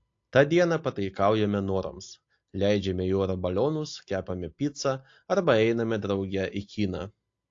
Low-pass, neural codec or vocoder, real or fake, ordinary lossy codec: 7.2 kHz; none; real; AAC, 48 kbps